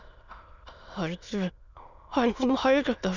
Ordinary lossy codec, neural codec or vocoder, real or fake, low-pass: none; autoencoder, 22.05 kHz, a latent of 192 numbers a frame, VITS, trained on many speakers; fake; 7.2 kHz